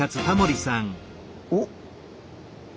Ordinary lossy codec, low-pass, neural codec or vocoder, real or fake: none; none; none; real